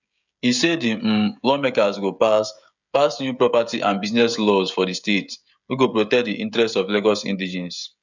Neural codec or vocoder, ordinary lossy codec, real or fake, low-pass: codec, 16 kHz, 16 kbps, FreqCodec, smaller model; none; fake; 7.2 kHz